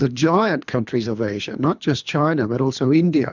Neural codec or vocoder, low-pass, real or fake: codec, 24 kHz, 3 kbps, HILCodec; 7.2 kHz; fake